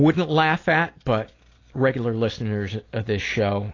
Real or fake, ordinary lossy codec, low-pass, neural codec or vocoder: real; AAC, 32 kbps; 7.2 kHz; none